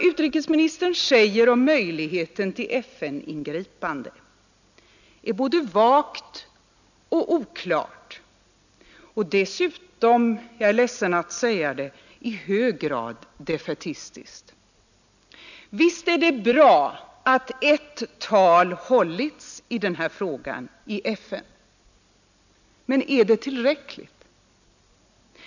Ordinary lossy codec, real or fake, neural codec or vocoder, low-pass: none; real; none; 7.2 kHz